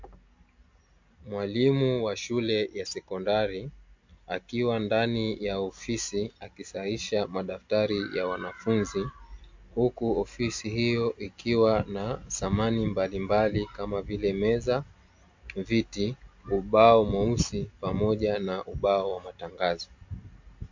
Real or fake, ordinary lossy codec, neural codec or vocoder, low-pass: real; MP3, 48 kbps; none; 7.2 kHz